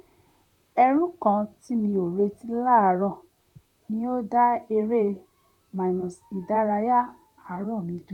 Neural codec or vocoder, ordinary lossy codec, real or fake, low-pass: vocoder, 44.1 kHz, 128 mel bands, Pupu-Vocoder; none; fake; 19.8 kHz